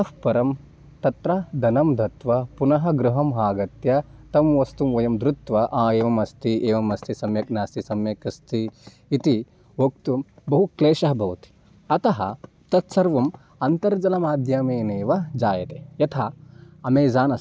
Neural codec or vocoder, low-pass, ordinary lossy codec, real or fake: none; none; none; real